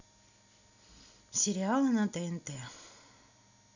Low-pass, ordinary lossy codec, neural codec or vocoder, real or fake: 7.2 kHz; none; none; real